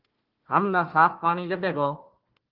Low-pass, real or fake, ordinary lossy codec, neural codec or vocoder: 5.4 kHz; fake; Opus, 16 kbps; codec, 16 kHz, 1 kbps, FunCodec, trained on Chinese and English, 50 frames a second